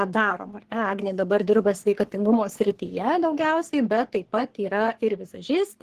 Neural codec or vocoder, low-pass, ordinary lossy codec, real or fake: codec, 44.1 kHz, 3.4 kbps, Pupu-Codec; 14.4 kHz; Opus, 16 kbps; fake